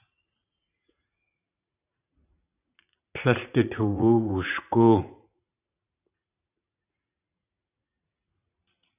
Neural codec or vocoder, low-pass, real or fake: vocoder, 44.1 kHz, 80 mel bands, Vocos; 3.6 kHz; fake